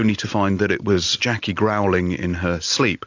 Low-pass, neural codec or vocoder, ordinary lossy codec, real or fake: 7.2 kHz; none; AAC, 48 kbps; real